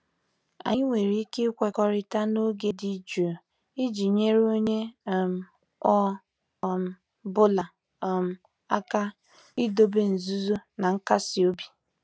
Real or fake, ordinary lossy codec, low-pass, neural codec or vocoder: real; none; none; none